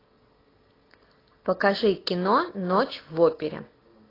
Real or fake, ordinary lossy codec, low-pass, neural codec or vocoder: real; AAC, 24 kbps; 5.4 kHz; none